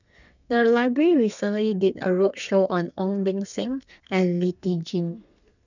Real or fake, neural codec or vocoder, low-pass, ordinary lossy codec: fake; codec, 32 kHz, 1.9 kbps, SNAC; 7.2 kHz; none